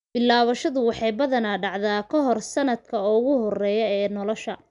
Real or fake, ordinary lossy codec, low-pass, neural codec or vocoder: real; none; 10.8 kHz; none